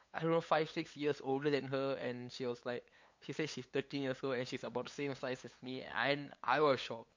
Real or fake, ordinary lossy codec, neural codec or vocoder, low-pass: fake; MP3, 48 kbps; codec, 16 kHz, 8 kbps, FunCodec, trained on LibriTTS, 25 frames a second; 7.2 kHz